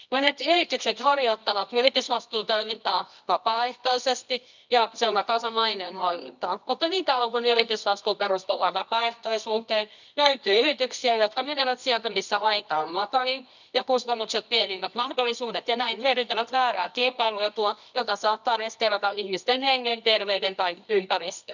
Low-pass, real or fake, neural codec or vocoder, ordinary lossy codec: 7.2 kHz; fake; codec, 24 kHz, 0.9 kbps, WavTokenizer, medium music audio release; none